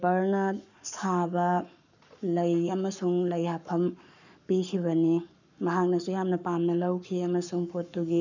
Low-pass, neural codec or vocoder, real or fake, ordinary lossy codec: 7.2 kHz; codec, 44.1 kHz, 7.8 kbps, Pupu-Codec; fake; none